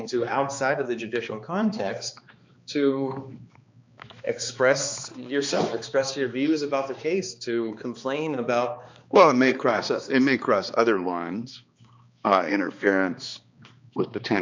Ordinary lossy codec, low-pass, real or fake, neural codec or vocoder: MP3, 64 kbps; 7.2 kHz; fake; codec, 16 kHz, 2 kbps, X-Codec, HuBERT features, trained on balanced general audio